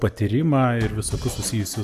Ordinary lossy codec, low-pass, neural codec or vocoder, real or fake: AAC, 96 kbps; 14.4 kHz; none; real